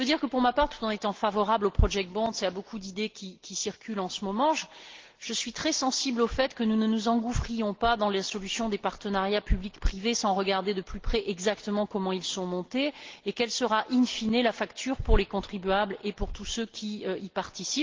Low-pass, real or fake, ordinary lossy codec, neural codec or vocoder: 7.2 kHz; real; Opus, 16 kbps; none